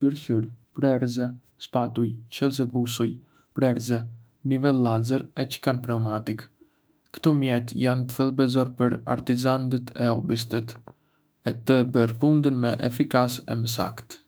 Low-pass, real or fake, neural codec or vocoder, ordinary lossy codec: none; fake; autoencoder, 48 kHz, 32 numbers a frame, DAC-VAE, trained on Japanese speech; none